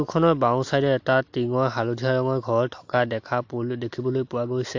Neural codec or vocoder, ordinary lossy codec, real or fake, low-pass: none; AAC, 48 kbps; real; 7.2 kHz